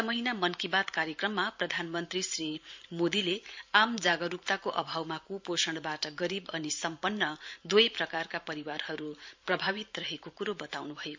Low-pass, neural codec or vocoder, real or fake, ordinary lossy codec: 7.2 kHz; vocoder, 44.1 kHz, 128 mel bands every 512 samples, BigVGAN v2; fake; MP3, 48 kbps